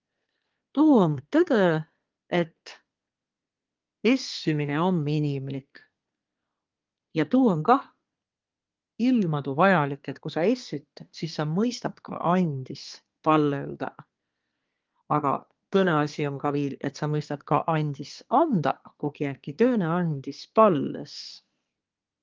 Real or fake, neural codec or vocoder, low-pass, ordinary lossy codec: fake; codec, 24 kHz, 1 kbps, SNAC; 7.2 kHz; Opus, 32 kbps